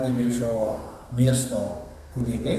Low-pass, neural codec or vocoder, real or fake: 14.4 kHz; codec, 32 kHz, 1.9 kbps, SNAC; fake